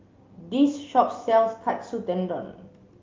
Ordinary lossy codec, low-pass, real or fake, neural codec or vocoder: Opus, 24 kbps; 7.2 kHz; real; none